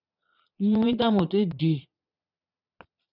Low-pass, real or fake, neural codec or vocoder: 5.4 kHz; fake; vocoder, 22.05 kHz, 80 mel bands, Vocos